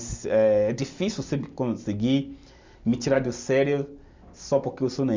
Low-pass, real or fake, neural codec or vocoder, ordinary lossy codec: 7.2 kHz; real; none; MP3, 64 kbps